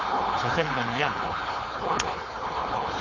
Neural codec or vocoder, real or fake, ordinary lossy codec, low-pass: codec, 16 kHz, 4.8 kbps, FACodec; fake; AAC, 32 kbps; 7.2 kHz